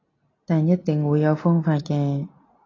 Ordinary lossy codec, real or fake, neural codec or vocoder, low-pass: AAC, 32 kbps; real; none; 7.2 kHz